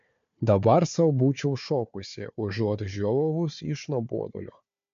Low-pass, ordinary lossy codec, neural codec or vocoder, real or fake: 7.2 kHz; MP3, 48 kbps; codec, 16 kHz, 4 kbps, FunCodec, trained on Chinese and English, 50 frames a second; fake